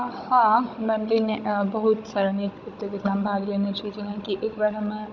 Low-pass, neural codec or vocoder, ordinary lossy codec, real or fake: 7.2 kHz; codec, 16 kHz, 16 kbps, FunCodec, trained on Chinese and English, 50 frames a second; none; fake